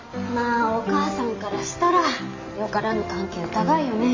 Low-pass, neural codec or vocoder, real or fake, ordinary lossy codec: 7.2 kHz; none; real; AAC, 48 kbps